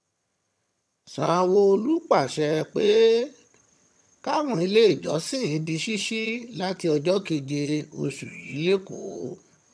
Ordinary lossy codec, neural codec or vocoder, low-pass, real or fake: none; vocoder, 22.05 kHz, 80 mel bands, HiFi-GAN; none; fake